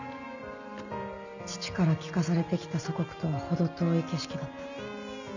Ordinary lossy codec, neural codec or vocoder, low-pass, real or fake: none; none; 7.2 kHz; real